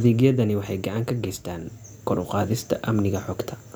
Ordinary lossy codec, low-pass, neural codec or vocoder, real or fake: none; none; none; real